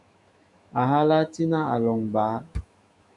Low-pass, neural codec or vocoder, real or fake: 10.8 kHz; autoencoder, 48 kHz, 128 numbers a frame, DAC-VAE, trained on Japanese speech; fake